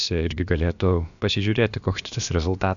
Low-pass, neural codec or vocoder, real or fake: 7.2 kHz; codec, 16 kHz, about 1 kbps, DyCAST, with the encoder's durations; fake